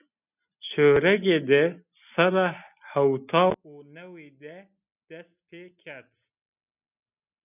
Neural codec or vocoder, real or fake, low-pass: none; real; 3.6 kHz